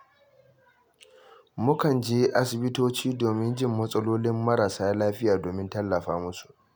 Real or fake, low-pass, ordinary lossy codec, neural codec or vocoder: real; none; none; none